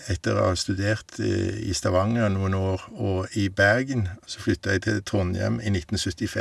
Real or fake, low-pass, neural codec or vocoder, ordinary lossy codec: real; none; none; none